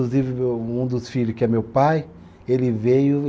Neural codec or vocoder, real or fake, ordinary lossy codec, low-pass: none; real; none; none